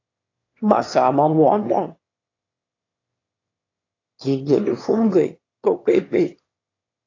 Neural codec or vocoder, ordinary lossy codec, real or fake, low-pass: autoencoder, 22.05 kHz, a latent of 192 numbers a frame, VITS, trained on one speaker; AAC, 32 kbps; fake; 7.2 kHz